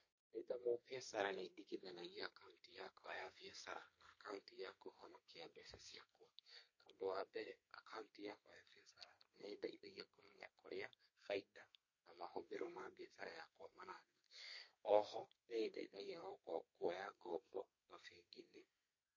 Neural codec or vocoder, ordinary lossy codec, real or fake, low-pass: codec, 32 kHz, 1.9 kbps, SNAC; MP3, 32 kbps; fake; 7.2 kHz